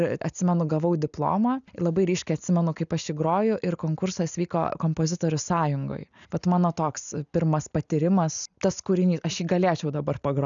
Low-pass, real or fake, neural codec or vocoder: 7.2 kHz; real; none